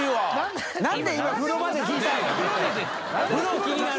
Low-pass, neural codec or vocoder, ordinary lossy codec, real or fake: none; none; none; real